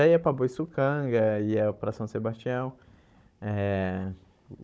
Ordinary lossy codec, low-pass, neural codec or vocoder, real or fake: none; none; codec, 16 kHz, 16 kbps, FunCodec, trained on Chinese and English, 50 frames a second; fake